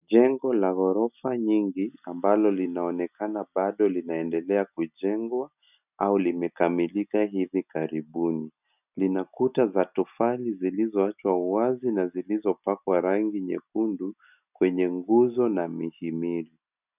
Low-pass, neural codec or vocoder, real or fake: 3.6 kHz; none; real